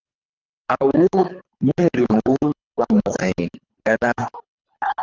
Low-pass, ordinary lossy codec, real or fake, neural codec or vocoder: 7.2 kHz; Opus, 32 kbps; fake; codec, 24 kHz, 3 kbps, HILCodec